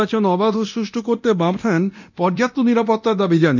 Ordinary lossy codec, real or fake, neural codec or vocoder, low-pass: none; fake; codec, 24 kHz, 0.9 kbps, DualCodec; 7.2 kHz